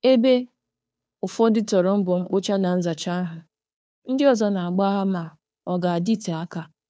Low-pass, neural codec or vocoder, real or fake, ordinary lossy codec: none; codec, 16 kHz, 2 kbps, FunCodec, trained on Chinese and English, 25 frames a second; fake; none